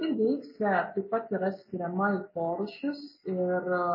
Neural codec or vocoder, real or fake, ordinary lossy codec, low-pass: none; real; MP3, 24 kbps; 5.4 kHz